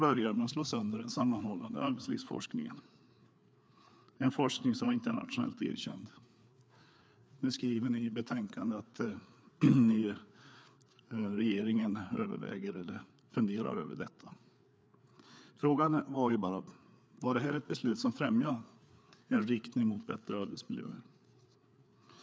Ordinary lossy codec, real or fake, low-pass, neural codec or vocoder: none; fake; none; codec, 16 kHz, 4 kbps, FreqCodec, larger model